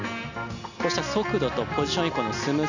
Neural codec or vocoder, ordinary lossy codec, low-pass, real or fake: none; none; 7.2 kHz; real